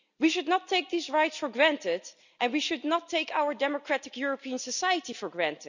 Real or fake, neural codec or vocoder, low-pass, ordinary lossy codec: real; none; 7.2 kHz; none